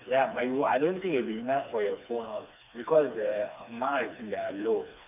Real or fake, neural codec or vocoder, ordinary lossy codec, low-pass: fake; codec, 16 kHz, 2 kbps, FreqCodec, smaller model; none; 3.6 kHz